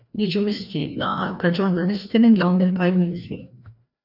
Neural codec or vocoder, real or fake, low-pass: codec, 16 kHz, 1 kbps, FreqCodec, larger model; fake; 5.4 kHz